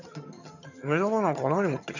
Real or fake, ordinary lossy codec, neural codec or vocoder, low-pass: fake; none; vocoder, 22.05 kHz, 80 mel bands, HiFi-GAN; 7.2 kHz